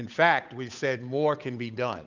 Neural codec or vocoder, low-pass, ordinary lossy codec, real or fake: codec, 16 kHz, 8 kbps, FunCodec, trained on Chinese and English, 25 frames a second; 7.2 kHz; Opus, 64 kbps; fake